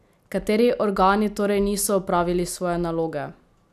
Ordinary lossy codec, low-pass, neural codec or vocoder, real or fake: none; 14.4 kHz; none; real